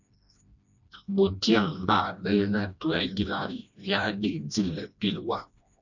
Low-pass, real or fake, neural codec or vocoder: 7.2 kHz; fake; codec, 16 kHz, 1 kbps, FreqCodec, smaller model